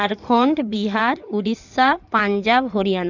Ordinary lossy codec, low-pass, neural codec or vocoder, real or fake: none; 7.2 kHz; codec, 16 kHz in and 24 kHz out, 2.2 kbps, FireRedTTS-2 codec; fake